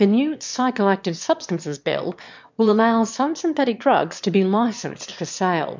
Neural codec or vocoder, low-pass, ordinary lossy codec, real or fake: autoencoder, 22.05 kHz, a latent of 192 numbers a frame, VITS, trained on one speaker; 7.2 kHz; MP3, 64 kbps; fake